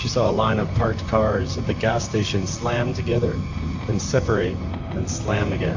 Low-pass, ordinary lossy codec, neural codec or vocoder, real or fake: 7.2 kHz; AAC, 48 kbps; vocoder, 44.1 kHz, 128 mel bands, Pupu-Vocoder; fake